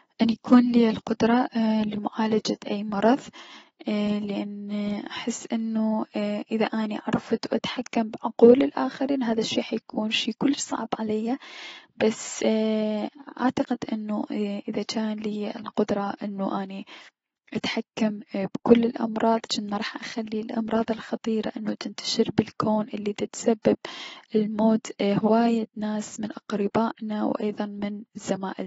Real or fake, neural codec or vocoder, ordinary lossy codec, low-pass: real; none; AAC, 24 kbps; 19.8 kHz